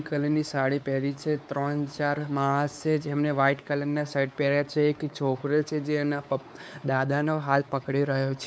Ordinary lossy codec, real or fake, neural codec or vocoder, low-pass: none; fake; codec, 16 kHz, 4 kbps, X-Codec, WavLM features, trained on Multilingual LibriSpeech; none